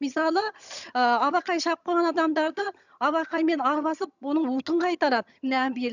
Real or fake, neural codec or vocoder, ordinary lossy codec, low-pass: fake; vocoder, 22.05 kHz, 80 mel bands, HiFi-GAN; none; 7.2 kHz